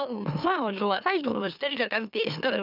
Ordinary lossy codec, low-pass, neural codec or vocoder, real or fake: none; 5.4 kHz; autoencoder, 44.1 kHz, a latent of 192 numbers a frame, MeloTTS; fake